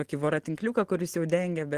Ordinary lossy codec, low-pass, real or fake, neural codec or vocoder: Opus, 16 kbps; 14.4 kHz; real; none